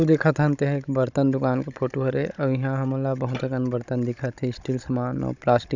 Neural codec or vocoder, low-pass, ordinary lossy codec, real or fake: codec, 16 kHz, 16 kbps, FunCodec, trained on Chinese and English, 50 frames a second; 7.2 kHz; none; fake